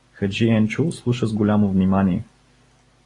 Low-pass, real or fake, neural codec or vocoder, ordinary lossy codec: 10.8 kHz; real; none; AAC, 32 kbps